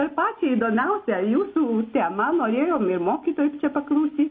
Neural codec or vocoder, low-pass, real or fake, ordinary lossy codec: none; 7.2 kHz; real; MP3, 32 kbps